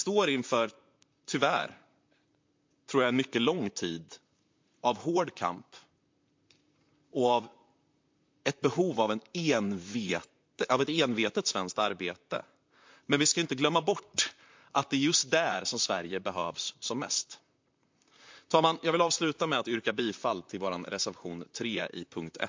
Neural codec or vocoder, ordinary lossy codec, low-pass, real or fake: vocoder, 44.1 kHz, 128 mel bands, Pupu-Vocoder; MP3, 48 kbps; 7.2 kHz; fake